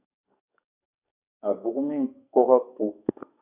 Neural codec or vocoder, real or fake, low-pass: codec, 44.1 kHz, 2.6 kbps, SNAC; fake; 3.6 kHz